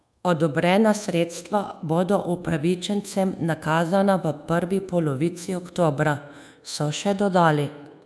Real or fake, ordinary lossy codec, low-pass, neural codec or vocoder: fake; none; none; codec, 24 kHz, 1.2 kbps, DualCodec